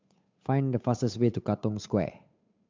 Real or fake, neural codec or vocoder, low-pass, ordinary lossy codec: fake; codec, 16 kHz, 8 kbps, FunCodec, trained on Chinese and English, 25 frames a second; 7.2 kHz; MP3, 64 kbps